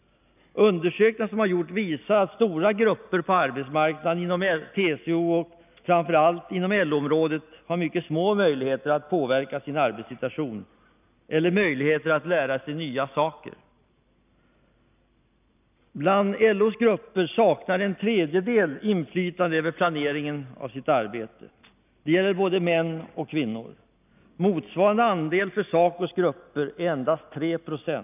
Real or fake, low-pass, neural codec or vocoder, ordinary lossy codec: real; 3.6 kHz; none; none